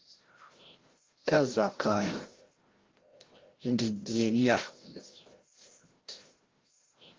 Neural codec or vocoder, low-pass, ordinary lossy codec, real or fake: codec, 16 kHz, 0.5 kbps, FreqCodec, larger model; 7.2 kHz; Opus, 16 kbps; fake